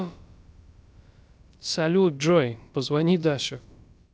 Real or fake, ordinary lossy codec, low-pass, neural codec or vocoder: fake; none; none; codec, 16 kHz, about 1 kbps, DyCAST, with the encoder's durations